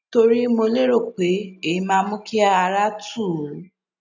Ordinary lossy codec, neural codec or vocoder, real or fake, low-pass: none; none; real; 7.2 kHz